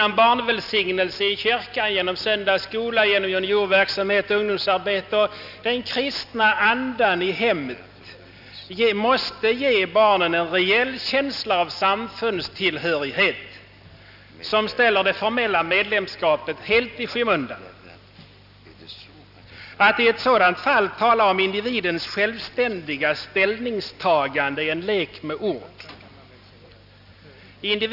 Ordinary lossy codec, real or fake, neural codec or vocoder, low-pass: none; real; none; 5.4 kHz